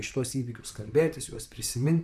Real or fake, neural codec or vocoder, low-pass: fake; vocoder, 44.1 kHz, 128 mel bands, Pupu-Vocoder; 14.4 kHz